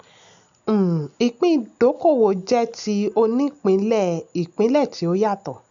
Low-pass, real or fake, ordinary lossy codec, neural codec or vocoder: 7.2 kHz; real; none; none